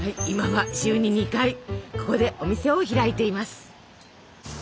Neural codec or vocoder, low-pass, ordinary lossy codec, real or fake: none; none; none; real